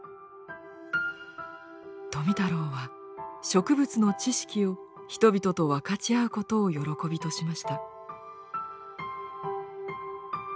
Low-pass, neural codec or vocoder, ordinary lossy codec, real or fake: none; none; none; real